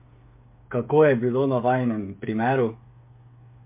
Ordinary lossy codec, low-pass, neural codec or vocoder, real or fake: MP3, 32 kbps; 3.6 kHz; codec, 16 kHz, 8 kbps, FreqCodec, smaller model; fake